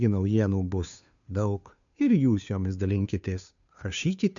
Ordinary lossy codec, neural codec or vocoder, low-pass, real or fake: MP3, 96 kbps; codec, 16 kHz, 2 kbps, FunCodec, trained on Chinese and English, 25 frames a second; 7.2 kHz; fake